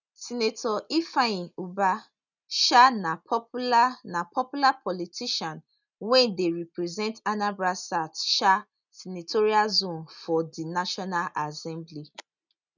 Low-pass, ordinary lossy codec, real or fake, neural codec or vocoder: 7.2 kHz; none; real; none